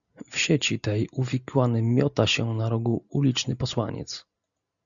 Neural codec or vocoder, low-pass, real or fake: none; 7.2 kHz; real